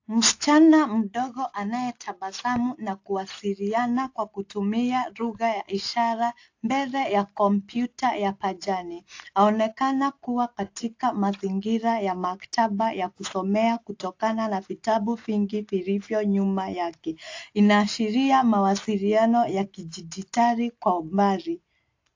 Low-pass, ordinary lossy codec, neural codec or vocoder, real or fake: 7.2 kHz; AAC, 48 kbps; none; real